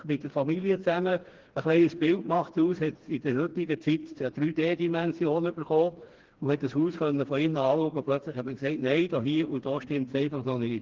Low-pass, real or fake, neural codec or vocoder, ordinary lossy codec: 7.2 kHz; fake; codec, 16 kHz, 2 kbps, FreqCodec, smaller model; Opus, 16 kbps